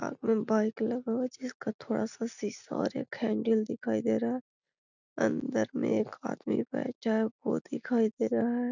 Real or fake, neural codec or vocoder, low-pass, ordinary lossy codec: real; none; 7.2 kHz; none